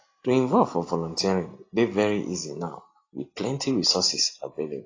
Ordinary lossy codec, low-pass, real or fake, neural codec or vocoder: AAC, 32 kbps; 7.2 kHz; real; none